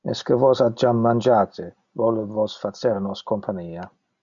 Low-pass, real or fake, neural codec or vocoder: 7.2 kHz; real; none